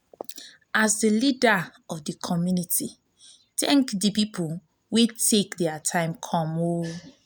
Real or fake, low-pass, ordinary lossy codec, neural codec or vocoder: real; none; none; none